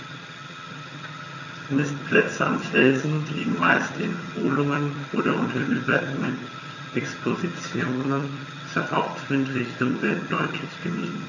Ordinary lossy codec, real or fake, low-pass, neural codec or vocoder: none; fake; 7.2 kHz; vocoder, 22.05 kHz, 80 mel bands, HiFi-GAN